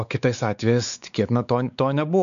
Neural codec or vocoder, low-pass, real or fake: codec, 16 kHz, 4 kbps, X-Codec, WavLM features, trained on Multilingual LibriSpeech; 7.2 kHz; fake